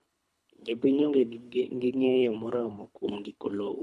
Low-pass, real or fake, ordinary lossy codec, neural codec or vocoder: none; fake; none; codec, 24 kHz, 3 kbps, HILCodec